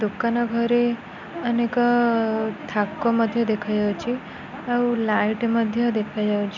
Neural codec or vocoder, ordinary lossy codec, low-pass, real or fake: none; none; 7.2 kHz; real